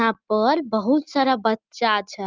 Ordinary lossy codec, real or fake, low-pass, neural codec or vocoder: Opus, 32 kbps; real; 7.2 kHz; none